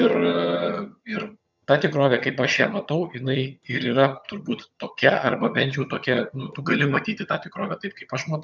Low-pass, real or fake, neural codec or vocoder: 7.2 kHz; fake; vocoder, 22.05 kHz, 80 mel bands, HiFi-GAN